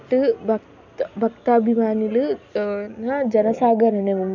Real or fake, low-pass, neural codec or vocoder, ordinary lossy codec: real; 7.2 kHz; none; none